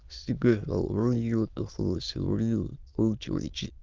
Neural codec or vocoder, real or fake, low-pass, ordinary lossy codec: autoencoder, 22.05 kHz, a latent of 192 numbers a frame, VITS, trained on many speakers; fake; 7.2 kHz; Opus, 32 kbps